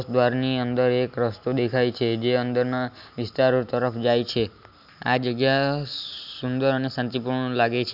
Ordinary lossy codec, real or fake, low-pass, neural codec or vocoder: none; real; 5.4 kHz; none